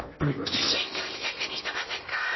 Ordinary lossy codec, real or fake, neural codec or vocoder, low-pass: MP3, 24 kbps; fake; codec, 16 kHz in and 24 kHz out, 0.8 kbps, FocalCodec, streaming, 65536 codes; 7.2 kHz